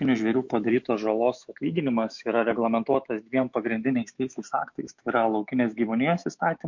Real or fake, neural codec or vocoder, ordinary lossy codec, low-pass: fake; autoencoder, 48 kHz, 128 numbers a frame, DAC-VAE, trained on Japanese speech; MP3, 48 kbps; 7.2 kHz